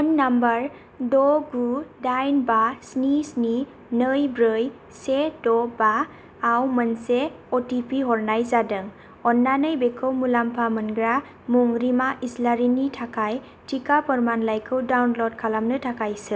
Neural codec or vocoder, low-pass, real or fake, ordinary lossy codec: none; none; real; none